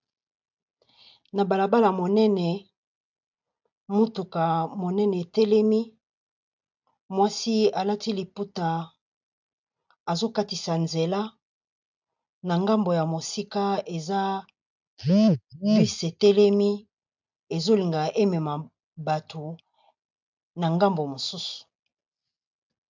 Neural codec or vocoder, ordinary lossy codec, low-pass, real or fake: none; MP3, 64 kbps; 7.2 kHz; real